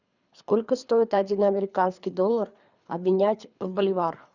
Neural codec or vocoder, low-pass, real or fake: codec, 24 kHz, 3 kbps, HILCodec; 7.2 kHz; fake